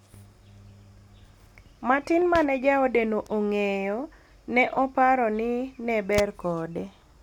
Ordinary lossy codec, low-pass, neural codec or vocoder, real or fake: none; 19.8 kHz; none; real